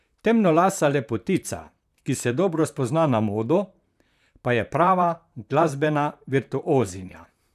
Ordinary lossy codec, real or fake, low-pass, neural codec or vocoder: none; fake; 14.4 kHz; vocoder, 44.1 kHz, 128 mel bands, Pupu-Vocoder